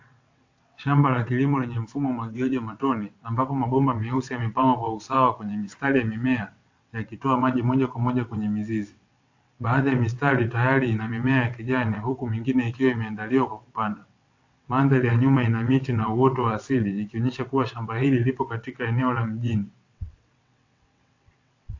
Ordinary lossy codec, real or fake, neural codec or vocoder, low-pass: AAC, 48 kbps; fake; vocoder, 22.05 kHz, 80 mel bands, WaveNeXt; 7.2 kHz